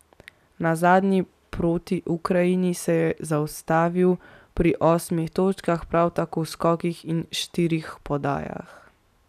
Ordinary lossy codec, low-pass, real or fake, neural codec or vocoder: none; 14.4 kHz; real; none